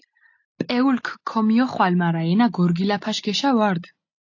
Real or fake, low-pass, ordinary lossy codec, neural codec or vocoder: real; 7.2 kHz; AAC, 48 kbps; none